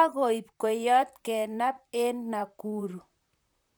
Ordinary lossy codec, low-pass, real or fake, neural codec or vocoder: none; none; fake; vocoder, 44.1 kHz, 128 mel bands, Pupu-Vocoder